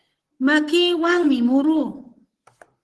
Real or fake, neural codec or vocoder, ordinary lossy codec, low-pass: fake; vocoder, 44.1 kHz, 128 mel bands, Pupu-Vocoder; Opus, 16 kbps; 10.8 kHz